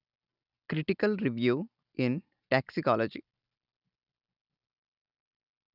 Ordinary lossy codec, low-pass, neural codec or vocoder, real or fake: none; 5.4 kHz; none; real